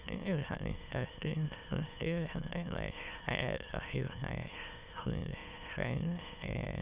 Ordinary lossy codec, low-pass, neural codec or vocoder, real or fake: Opus, 64 kbps; 3.6 kHz; autoencoder, 22.05 kHz, a latent of 192 numbers a frame, VITS, trained on many speakers; fake